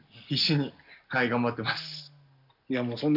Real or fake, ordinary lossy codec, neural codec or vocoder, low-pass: real; none; none; 5.4 kHz